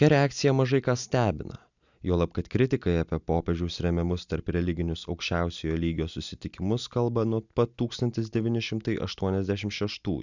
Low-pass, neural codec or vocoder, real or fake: 7.2 kHz; none; real